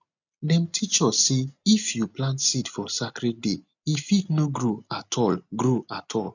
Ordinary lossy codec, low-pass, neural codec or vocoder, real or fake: none; 7.2 kHz; none; real